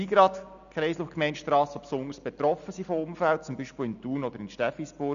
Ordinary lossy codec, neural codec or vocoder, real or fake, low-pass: none; none; real; 7.2 kHz